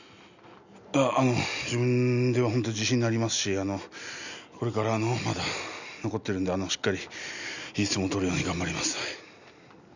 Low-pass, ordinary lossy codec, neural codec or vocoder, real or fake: 7.2 kHz; none; none; real